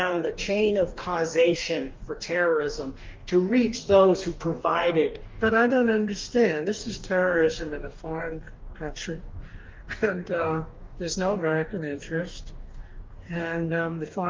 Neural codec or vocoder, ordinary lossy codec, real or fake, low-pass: codec, 44.1 kHz, 2.6 kbps, DAC; Opus, 32 kbps; fake; 7.2 kHz